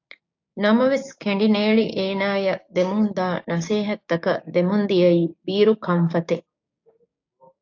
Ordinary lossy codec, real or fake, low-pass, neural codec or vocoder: AAC, 48 kbps; fake; 7.2 kHz; codec, 16 kHz, 6 kbps, DAC